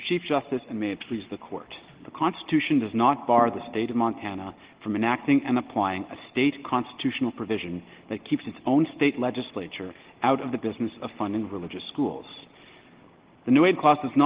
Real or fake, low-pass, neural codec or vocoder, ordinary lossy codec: real; 3.6 kHz; none; Opus, 16 kbps